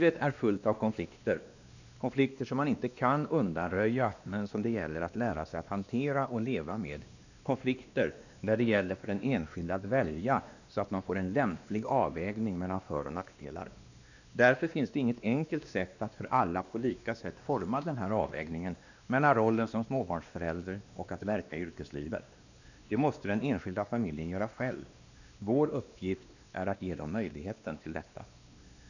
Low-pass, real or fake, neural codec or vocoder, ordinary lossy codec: 7.2 kHz; fake; codec, 16 kHz, 2 kbps, X-Codec, WavLM features, trained on Multilingual LibriSpeech; none